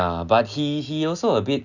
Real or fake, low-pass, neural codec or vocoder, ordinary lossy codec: real; 7.2 kHz; none; none